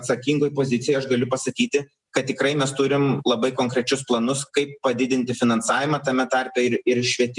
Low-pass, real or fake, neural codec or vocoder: 10.8 kHz; real; none